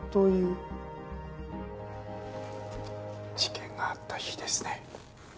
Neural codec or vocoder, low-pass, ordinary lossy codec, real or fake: none; none; none; real